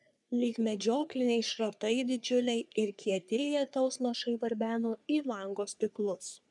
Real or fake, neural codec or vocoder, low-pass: fake; codec, 44.1 kHz, 3.4 kbps, Pupu-Codec; 10.8 kHz